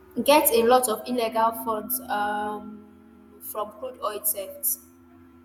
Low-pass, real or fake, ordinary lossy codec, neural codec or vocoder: none; fake; none; vocoder, 48 kHz, 128 mel bands, Vocos